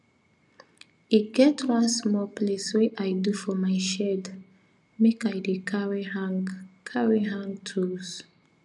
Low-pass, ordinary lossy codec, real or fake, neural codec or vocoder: 10.8 kHz; none; real; none